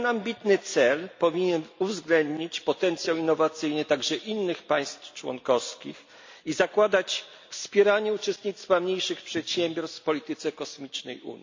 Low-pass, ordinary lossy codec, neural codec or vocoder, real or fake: 7.2 kHz; MP3, 48 kbps; none; real